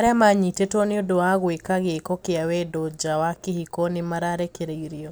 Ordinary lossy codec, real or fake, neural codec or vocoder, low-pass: none; real; none; none